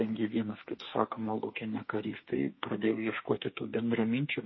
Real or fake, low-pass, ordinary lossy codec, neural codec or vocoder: fake; 7.2 kHz; MP3, 24 kbps; codec, 32 kHz, 1.9 kbps, SNAC